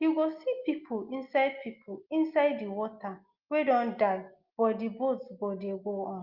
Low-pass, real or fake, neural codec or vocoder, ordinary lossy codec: 5.4 kHz; real; none; Opus, 24 kbps